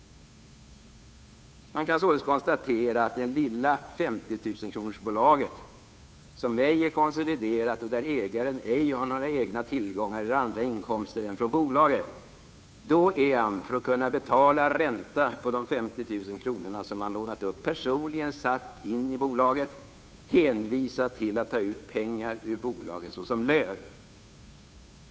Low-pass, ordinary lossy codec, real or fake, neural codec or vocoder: none; none; fake; codec, 16 kHz, 2 kbps, FunCodec, trained on Chinese and English, 25 frames a second